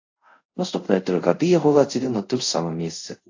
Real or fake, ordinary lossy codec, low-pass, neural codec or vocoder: fake; AAC, 48 kbps; 7.2 kHz; codec, 24 kHz, 0.5 kbps, DualCodec